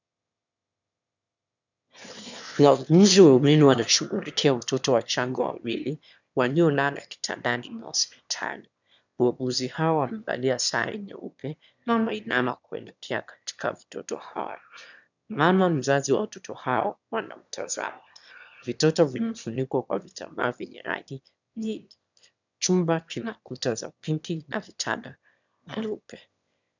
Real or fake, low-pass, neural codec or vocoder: fake; 7.2 kHz; autoencoder, 22.05 kHz, a latent of 192 numbers a frame, VITS, trained on one speaker